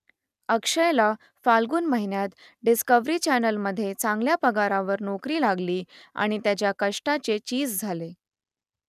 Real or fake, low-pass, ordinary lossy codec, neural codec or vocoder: real; 14.4 kHz; none; none